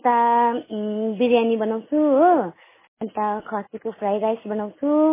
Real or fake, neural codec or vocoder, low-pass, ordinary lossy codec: real; none; 3.6 kHz; MP3, 16 kbps